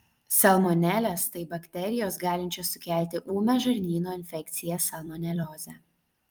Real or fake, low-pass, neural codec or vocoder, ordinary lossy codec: fake; 19.8 kHz; vocoder, 44.1 kHz, 128 mel bands every 256 samples, BigVGAN v2; Opus, 32 kbps